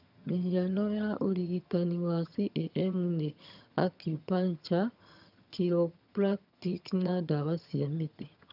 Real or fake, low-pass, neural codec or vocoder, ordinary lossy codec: fake; 5.4 kHz; vocoder, 22.05 kHz, 80 mel bands, HiFi-GAN; none